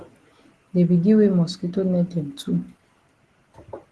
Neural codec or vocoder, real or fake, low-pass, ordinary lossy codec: none; real; 10.8 kHz; Opus, 16 kbps